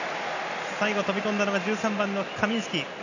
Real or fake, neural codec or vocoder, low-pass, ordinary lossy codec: real; none; 7.2 kHz; none